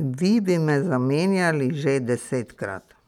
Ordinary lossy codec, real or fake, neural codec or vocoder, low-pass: none; real; none; 19.8 kHz